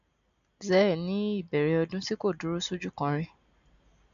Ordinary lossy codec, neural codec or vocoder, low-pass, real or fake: MP3, 96 kbps; none; 7.2 kHz; real